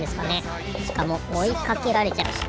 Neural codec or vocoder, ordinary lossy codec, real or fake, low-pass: none; none; real; none